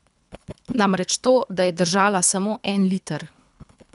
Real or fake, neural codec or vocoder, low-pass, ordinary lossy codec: fake; codec, 24 kHz, 3 kbps, HILCodec; 10.8 kHz; none